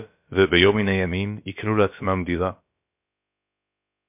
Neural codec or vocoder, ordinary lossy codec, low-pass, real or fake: codec, 16 kHz, about 1 kbps, DyCAST, with the encoder's durations; MP3, 32 kbps; 3.6 kHz; fake